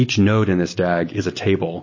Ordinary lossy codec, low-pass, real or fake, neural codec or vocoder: MP3, 32 kbps; 7.2 kHz; real; none